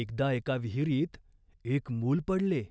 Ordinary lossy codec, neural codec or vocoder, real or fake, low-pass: none; none; real; none